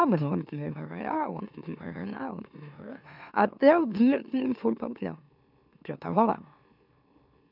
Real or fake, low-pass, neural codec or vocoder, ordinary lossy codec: fake; 5.4 kHz; autoencoder, 44.1 kHz, a latent of 192 numbers a frame, MeloTTS; none